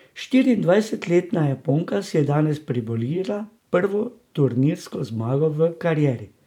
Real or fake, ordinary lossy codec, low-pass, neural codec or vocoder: fake; none; 19.8 kHz; vocoder, 44.1 kHz, 128 mel bands every 512 samples, BigVGAN v2